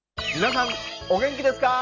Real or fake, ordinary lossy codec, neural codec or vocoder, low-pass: real; none; none; 7.2 kHz